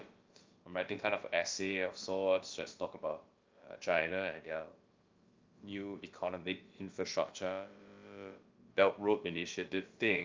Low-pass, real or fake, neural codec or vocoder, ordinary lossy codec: 7.2 kHz; fake; codec, 16 kHz, about 1 kbps, DyCAST, with the encoder's durations; Opus, 24 kbps